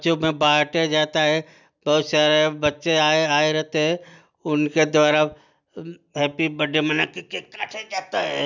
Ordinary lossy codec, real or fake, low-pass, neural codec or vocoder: none; real; 7.2 kHz; none